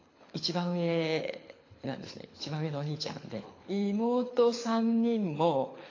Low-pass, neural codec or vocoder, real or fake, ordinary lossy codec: 7.2 kHz; codec, 24 kHz, 6 kbps, HILCodec; fake; AAC, 32 kbps